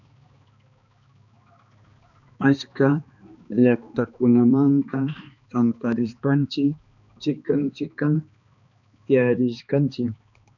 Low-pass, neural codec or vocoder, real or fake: 7.2 kHz; codec, 16 kHz, 2 kbps, X-Codec, HuBERT features, trained on balanced general audio; fake